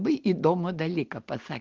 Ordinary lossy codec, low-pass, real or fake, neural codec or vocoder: Opus, 16 kbps; 7.2 kHz; real; none